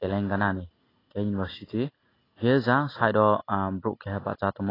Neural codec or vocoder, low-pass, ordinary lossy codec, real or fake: none; 5.4 kHz; AAC, 24 kbps; real